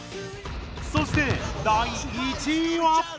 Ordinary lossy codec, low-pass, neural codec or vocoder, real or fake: none; none; none; real